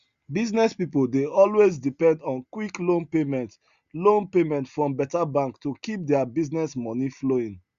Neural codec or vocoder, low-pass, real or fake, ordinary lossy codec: none; 7.2 kHz; real; Opus, 64 kbps